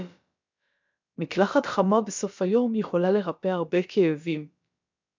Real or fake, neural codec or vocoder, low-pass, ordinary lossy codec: fake; codec, 16 kHz, about 1 kbps, DyCAST, with the encoder's durations; 7.2 kHz; MP3, 64 kbps